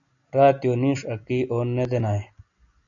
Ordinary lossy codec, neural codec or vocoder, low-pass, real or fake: MP3, 96 kbps; none; 7.2 kHz; real